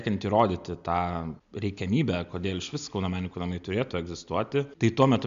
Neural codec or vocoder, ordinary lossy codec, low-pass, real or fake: none; MP3, 64 kbps; 7.2 kHz; real